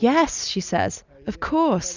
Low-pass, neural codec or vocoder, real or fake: 7.2 kHz; none; real